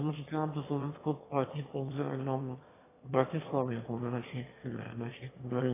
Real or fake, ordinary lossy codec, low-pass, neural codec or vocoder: fake; AAC, 16 kbps; 3.6 kHz; autoencoder, 22.05 kHz, a latent of 192 numbers a frame, VITS, trained on one speaker